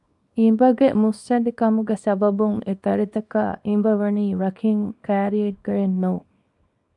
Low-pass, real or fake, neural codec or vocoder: 10.8 kHz; fake; codec, 24 kHz, 0.9 kbps, WavTokenizer, small release